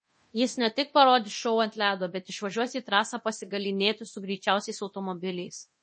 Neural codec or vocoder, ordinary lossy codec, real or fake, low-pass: codec, 24 kHz, 0.9 kbps, DualCodec; MP3, 32 kbps; fake; 9.9 kHz